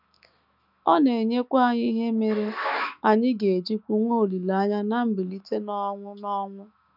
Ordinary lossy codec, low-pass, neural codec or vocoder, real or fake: none; 5.4 kHz; autoencoder, 48 kHz, 128 numbers a frame, DAC-VAE, trained on Japanese speech; fake